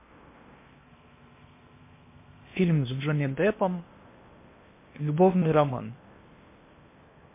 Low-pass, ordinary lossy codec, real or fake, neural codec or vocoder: 3.6 kHz; MP3, 24 kbps; fake; codec, 16 kHz in and 24 kHz out, 0.8 kbps, FocalCodec, streaming, 65536 codes